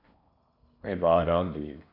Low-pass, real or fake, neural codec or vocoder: 5.4 kHz; fake; codec, 16 kHz in and 24 kHz out, 0.8 kbps, FocalCodec, streaming, 65536 codes